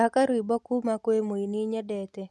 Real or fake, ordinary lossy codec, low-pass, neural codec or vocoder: real; none; none; none